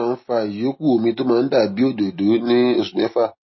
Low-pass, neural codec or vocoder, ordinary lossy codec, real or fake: 7.2 kHz; none; MP3, 24 kbps; real